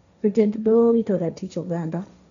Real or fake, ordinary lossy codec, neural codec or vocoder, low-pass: fake; none; codec, 16 kHz, 1.1 kbps, Voila-Tokenizer; 7.2 kHz